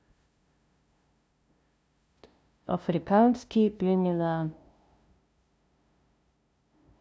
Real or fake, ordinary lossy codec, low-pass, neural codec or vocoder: fake; none; none; codec, 16 kHz, 0.5 kbps, FunCodec, trained on LibriTTS, 25 frames a second